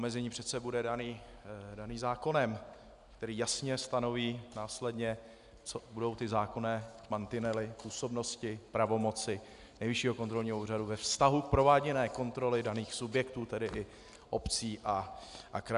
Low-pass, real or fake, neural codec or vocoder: 10.8 kHz; real; none